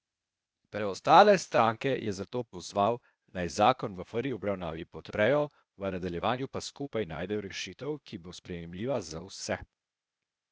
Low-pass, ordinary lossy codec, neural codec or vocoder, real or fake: none; none; codec, 16 kHz, 0.8 kbps, ZipCodec; fake